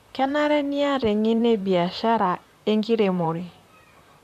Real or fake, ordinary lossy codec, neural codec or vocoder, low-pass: fake; none; vocoder, 44.1 kHz, 128 mel bands, Pupu-Vocoder; 14.4 kHz